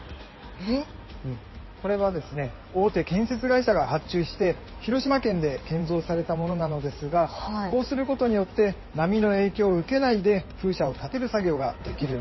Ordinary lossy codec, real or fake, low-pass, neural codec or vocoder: MP3, 24 kbps; fake; 7.2 kHz; codec, 16 kHz in and 24 kHz out, 2.2 kbps, FireRedTTS-2 codec